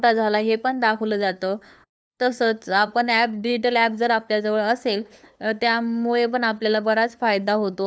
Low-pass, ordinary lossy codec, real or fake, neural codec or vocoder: none; none; fake; codec, 16 kHz, 2 kbps, FunCodec, trained on LibriTTS, 25 frames a second